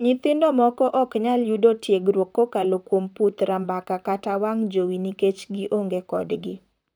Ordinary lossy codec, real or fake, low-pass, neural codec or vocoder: none; fake; none; vocoder, 44.1 kHz, 128 mel bands, Pupu-Vocoder